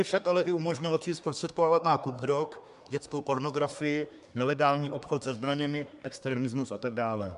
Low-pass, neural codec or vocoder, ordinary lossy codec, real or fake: 10.8 kHz; codec, 24 kHz, 1 kbps, SNAC; MP3, 96 kbps; fake